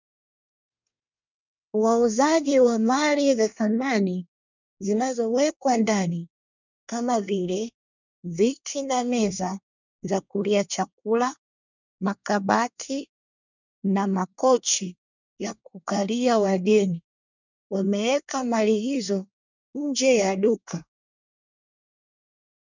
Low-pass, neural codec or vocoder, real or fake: 7.2 kHz; codec, 24 kHz, 1 kbps, SNAC; fake